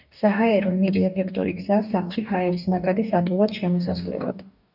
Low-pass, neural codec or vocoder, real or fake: 5.4 kHz; codec, 44.1 kHz, 2.6 kbps, DAC; fake